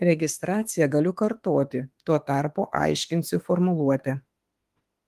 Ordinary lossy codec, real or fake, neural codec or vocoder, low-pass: Opus, 24 kbps; fake; autoencoder, 48 kHz, 32 numbers a frame, DAC-VAE, trained on Japanese speech; 14.4 kHz